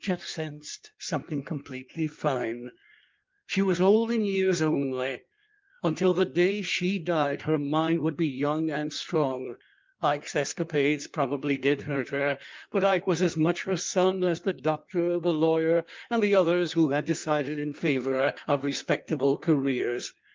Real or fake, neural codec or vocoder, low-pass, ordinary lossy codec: fake; codec, 16 kHz in and 24 kHz out, 1.1 kbps, FireRedTTS-2 codec; 7.2 kHz; Opus, 24 kbps